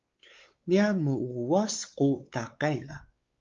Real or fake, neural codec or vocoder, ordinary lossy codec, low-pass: fake; codec, 16 kHz, 4 kbps, X-Codec, WavLM features, trained on Multilingual LibriSpeech; Opus, 32 kbps; 7.2 kHz